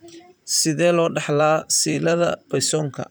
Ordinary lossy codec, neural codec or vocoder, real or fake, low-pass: none; vocoder, 44.1 kHz, 128 mel bands, Pupu-Vocoder; fake; none